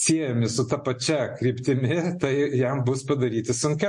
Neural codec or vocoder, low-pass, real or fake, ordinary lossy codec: none; 10.8 kHz; real; MP3, 48 kbps